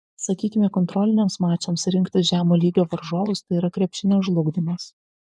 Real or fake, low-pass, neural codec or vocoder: fake; 10.8 kHz; vocoder, 24 kHz, 100 mel bands, Vocos